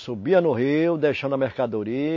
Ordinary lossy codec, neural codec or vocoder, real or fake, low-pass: MP3, 32 kbps; none; real; 7.2 kHz